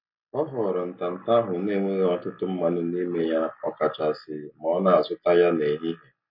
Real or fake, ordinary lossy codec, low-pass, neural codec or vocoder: real; MP3, 32 kbps; 5.4 kHz; none